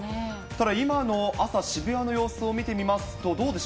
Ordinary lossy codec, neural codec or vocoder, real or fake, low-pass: none; none; real; none